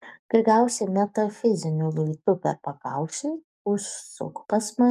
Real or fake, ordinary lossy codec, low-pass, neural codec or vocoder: fake; MP3, 96 kbps; 14.4 kHz; codec, 44.1 kHz, 7.8 kbps, DAC